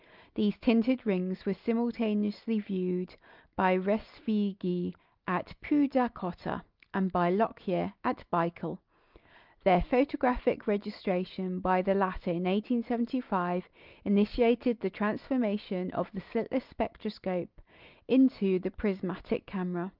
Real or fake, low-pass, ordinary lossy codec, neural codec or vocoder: real; 5.4 kHz; Opus, 24 kbps; none